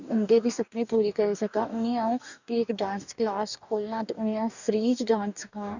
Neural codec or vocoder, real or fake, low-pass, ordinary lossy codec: codec, 44.1 kHz, 2.6 kbps, DAC; fake; 7.2 kHz; none